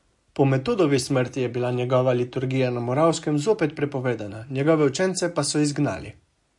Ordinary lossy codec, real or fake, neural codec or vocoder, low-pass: MP3, 48 kbps; real; none; 10.8 kHz